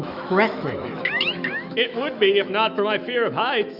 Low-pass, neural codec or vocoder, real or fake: 5.4 kHz; autoencoder, 48 kHz, 128 numbers a frame, DAC-VAE, trained on Japanese speech; fake